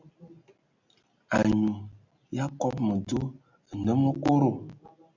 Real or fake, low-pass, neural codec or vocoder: real; 7.2 kHz; none